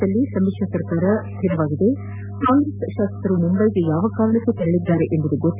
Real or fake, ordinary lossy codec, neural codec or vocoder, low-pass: real; none; none; 3.6 kHz